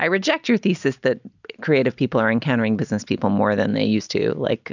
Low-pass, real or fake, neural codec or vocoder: 7.2 kHz; real; none